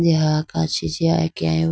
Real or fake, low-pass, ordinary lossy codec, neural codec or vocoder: real; none; none; none